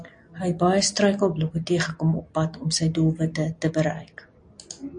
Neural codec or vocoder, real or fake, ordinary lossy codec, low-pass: none; real; AAC, 64 kbps; 9.9 kHz